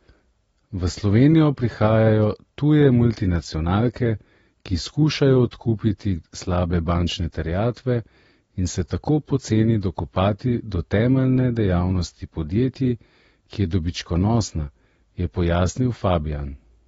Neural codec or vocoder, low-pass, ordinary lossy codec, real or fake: none; 19.8 kHz; AAC, 24 kbps; real